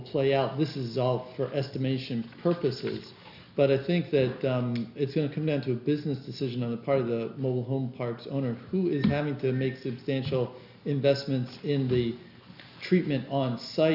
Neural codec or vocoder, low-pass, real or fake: none; 5.4 kHz; real